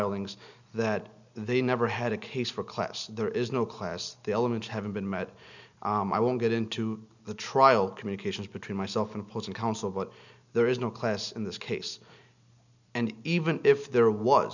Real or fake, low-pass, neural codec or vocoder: real; 7.2 kHz; none